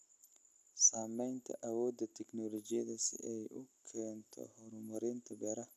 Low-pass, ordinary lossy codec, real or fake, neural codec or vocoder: 14.4 kHz; none; real; none